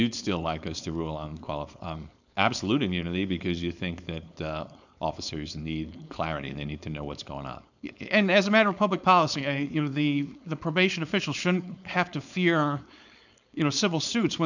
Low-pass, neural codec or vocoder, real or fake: 7.2 kHz; codec, 16 kHz, 4.8 kbps, FACodec; fake